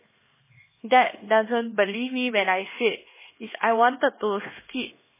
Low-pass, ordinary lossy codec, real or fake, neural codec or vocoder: 3.6 kHz; MP3, 16 kbps; fake; codec, 16 kHz, 2 kbps, X-Codec, HuBERT features, trained on LibriSpeech